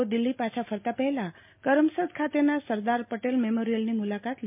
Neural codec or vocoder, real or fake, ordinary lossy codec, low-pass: none; real; MP3, 32 kbps; 3.6 kHz